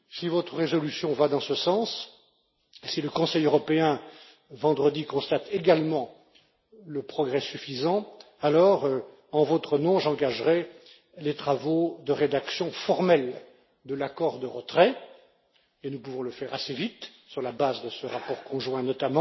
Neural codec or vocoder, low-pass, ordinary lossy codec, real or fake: none; 7.2 kHz; MP3, 24 kbps; real